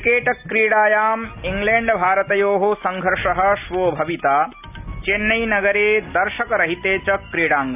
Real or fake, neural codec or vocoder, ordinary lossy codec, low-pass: real; none; none; 3.6 kHz